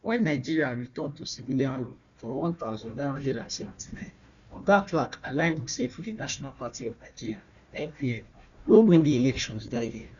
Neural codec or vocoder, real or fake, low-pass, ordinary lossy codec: codec, 16 kHz, 1 kbps, FunCodec, trained on Chinese and English, 50 frames a second; fake; 7.2 kHz; none